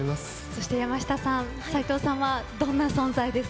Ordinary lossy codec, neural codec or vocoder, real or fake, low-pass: none; none; real; none